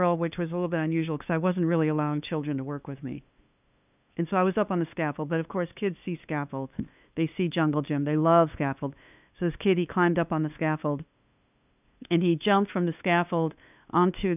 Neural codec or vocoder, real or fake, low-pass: codec, 16 kHz, 2 kbps, FunCodec, trained on LibriTTS, 25 frames a second; fake; 3.6 kHz